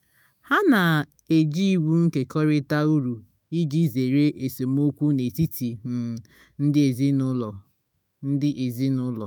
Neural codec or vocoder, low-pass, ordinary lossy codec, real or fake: autoencoder, 48 kHz, 128 numbers a frame, DAC-VAE, trained on Japanese speech; none; none; fake